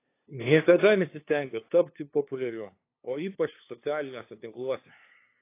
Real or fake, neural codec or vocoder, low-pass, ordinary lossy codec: fake; codec, 16 kHz, 2 kbps, FunCodec, trained on LibriTTS, 25 frames a second; 3.6 kHz; AAC, 24 kbps